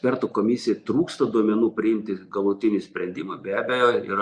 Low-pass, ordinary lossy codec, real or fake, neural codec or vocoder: 9.9 kHz; Opus, 64 kbps; real; none